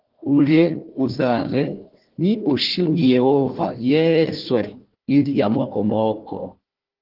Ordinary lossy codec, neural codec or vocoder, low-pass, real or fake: Opus, 16 kbps; codec, 16 kHz, 1 kbps, FunCodec, trained on Chinese and English, 50 frames a second; 5.4 kHz; fake